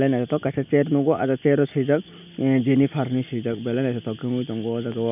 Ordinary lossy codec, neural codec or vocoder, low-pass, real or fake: none; none; 3.6 kHz; real